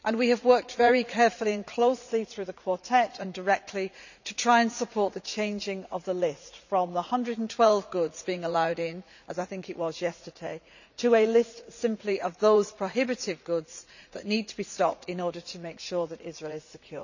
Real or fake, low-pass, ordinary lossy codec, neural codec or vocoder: fake; 7.2 kHz; none; vocoder, 44.1 kHz, 80 mel bands, Vocos